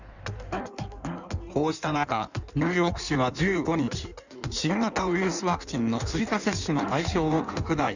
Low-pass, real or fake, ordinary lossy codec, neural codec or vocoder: 7.2 kHz; fake; none; codec, 16 kHz in and 24 kHz out, 1.1 kbps, FireRedTTS-2 codec